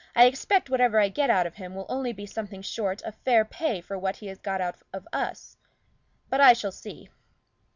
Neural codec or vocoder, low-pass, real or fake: none; 7.2 kHz; real